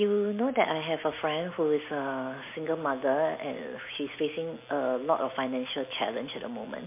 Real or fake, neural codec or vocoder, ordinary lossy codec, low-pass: real; none; MP3, 24 kbps; 3.6 kHz